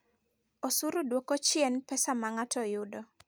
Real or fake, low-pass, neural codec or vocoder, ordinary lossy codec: real; none; none; none